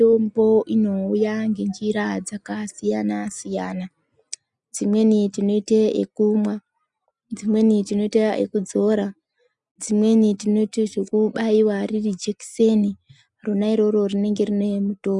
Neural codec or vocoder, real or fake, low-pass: none; real; 10.8 kHz